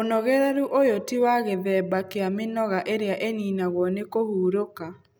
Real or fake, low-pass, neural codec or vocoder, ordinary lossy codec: real; none; none; none